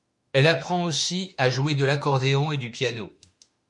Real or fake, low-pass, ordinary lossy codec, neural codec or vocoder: fake; 10.8 kHz; MP3, 48 kbps; autoencoder, 48 kHz, 32 numbers a frame, DAC-VAE, trained on Japanese speech